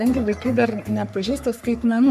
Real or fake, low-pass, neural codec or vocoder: fake; 14.4 kHz; codec, 44.1 kHz, 3.4 kbps, Pupu-Codec